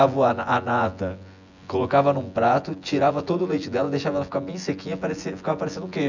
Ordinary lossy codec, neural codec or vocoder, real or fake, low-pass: none; vocoder, 24 kHz, 100 mel bands, Vocos; fake; 7.2 kHz